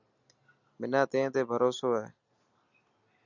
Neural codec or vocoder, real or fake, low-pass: none; real; 7.2 kHz